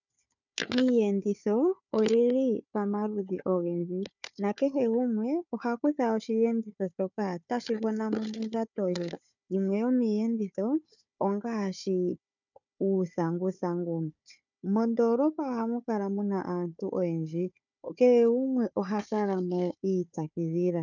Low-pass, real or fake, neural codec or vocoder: 7.2 kHz; fake; codec, 16 kHz, 4 kbps, FunCodec, trained on Chinese and English, 50 frames a second